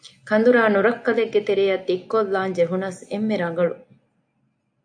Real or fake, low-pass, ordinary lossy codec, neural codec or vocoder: real; 9.9 kHz; AAC, 64 kbps; none